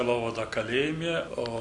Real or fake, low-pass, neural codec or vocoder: real; 10.8 kHz; none